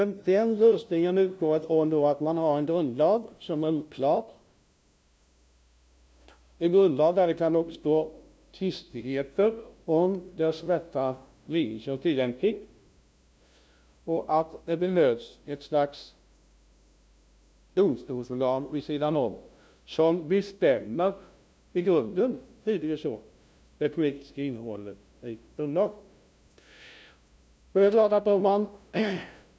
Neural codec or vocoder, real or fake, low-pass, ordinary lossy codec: codec, 16 kHz, 0.5 kbps, FunCodec, trained on LibriTTS, 25 frames a second; fake; none; none